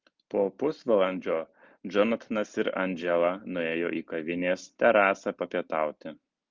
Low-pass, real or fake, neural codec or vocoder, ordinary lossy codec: 7.2 kHz; real; none; Opus, 32 kbps